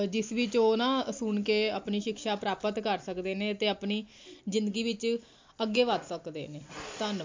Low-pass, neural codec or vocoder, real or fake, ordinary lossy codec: 7.2 kHz; none; real; MP3, 48 kbps